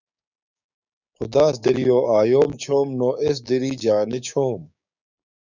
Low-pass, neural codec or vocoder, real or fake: 7.2 kHz; codec, 16 kHz, 6 kbps, DAC; fake